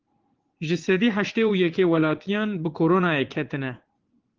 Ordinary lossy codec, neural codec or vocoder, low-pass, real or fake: Opus, 16 kbps; vocoder, 44.1 kHz, 80 mel bands, Vocos; 7.2 kHz; fake